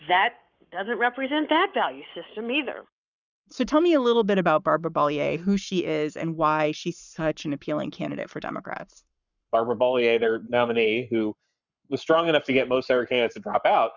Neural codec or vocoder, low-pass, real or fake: codec, 44.1 kHz, 7.8 kbps, Pupu-Codec; 7.2 kHz; fake